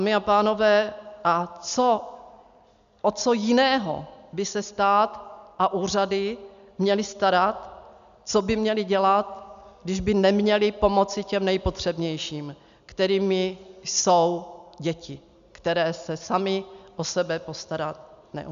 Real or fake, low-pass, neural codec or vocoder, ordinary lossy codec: real; 7.2 kHz; none; MP3, 96 kbps